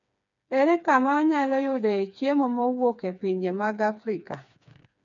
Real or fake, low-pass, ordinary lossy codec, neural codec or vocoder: fake; 7.2 kHz; none; codec, 16 kHz, 4 kbps, FreqCodec, smaller model